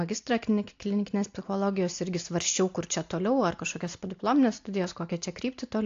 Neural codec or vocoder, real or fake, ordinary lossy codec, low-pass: none; real; AAC, 48 kbps; 7.2 kHz